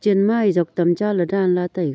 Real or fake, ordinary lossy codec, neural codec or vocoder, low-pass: real; none; none; none